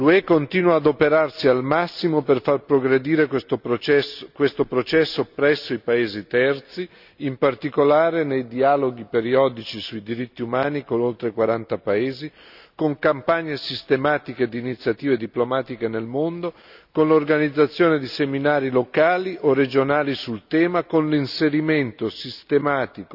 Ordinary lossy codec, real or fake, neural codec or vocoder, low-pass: none; real; none; 5.4 kHz